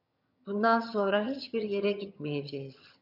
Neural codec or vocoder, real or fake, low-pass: vocoder, 22.05 kHz, 80 mel bands, HiFi-GAN; fake; 5.4 kHz